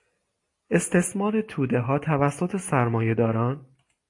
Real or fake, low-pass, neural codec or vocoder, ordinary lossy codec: real; 10.8 kHz; none; AAC, 48 kbps